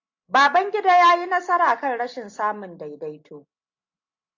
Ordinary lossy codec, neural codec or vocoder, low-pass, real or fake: AAC, 48 kbps; none; 7.2 kHz; real